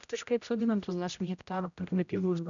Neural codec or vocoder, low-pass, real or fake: codec, 16 kHz, 0.5 kbps, X-Codec, HuBERT features, trained on general audio; 7.2 kHz; fake